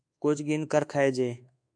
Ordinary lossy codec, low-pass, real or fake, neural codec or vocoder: AAC, 64 kbps; 9.9 kHz; fake; codec, 24 kHz, 1.2 kbps, DualCodec